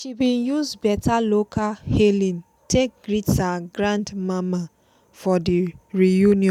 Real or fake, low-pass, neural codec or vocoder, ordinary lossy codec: real; 19.8 kHz; none; none